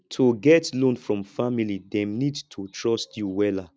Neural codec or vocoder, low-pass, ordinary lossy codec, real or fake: none; none; none; real